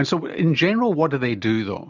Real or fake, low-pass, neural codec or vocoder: real; 7.2 kHz; none